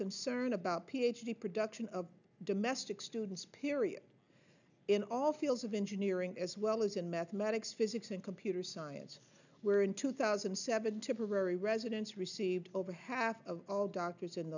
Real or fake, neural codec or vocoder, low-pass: real; none; 7.2 kHz